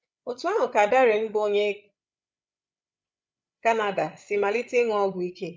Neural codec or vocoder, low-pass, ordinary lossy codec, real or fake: codec, 16 kHz, 16 kbps, FreqCodec, larger model; none; none; fake